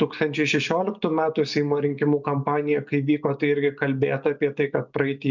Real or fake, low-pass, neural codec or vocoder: real; 7.2 kHz; none